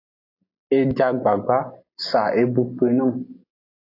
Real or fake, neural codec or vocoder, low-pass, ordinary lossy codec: real; none; 5.4 kHz; AAC, 32 kbps